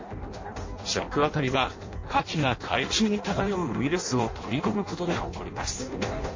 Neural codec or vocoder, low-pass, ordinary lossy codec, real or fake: codec, 16 kHz in and 24 kHz out, 0.6 kbps, FireRedTTS-2 codec; 7.2 kHz; MP3, 32 kbps; fake